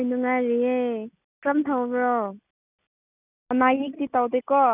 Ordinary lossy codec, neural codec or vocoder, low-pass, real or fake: none; none; 3.6 kHz; real